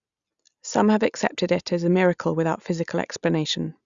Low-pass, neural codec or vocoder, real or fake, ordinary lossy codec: 7.2 kHz; none; real; Opus, 64 kbps